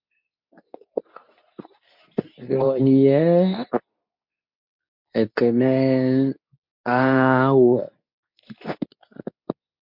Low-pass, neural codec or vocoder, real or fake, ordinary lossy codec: 5.4 kHz; codec, 24 kHz, 0.9 kbps, WavTokenizer, medium speech release version 2; fake; MP3, 48 kbps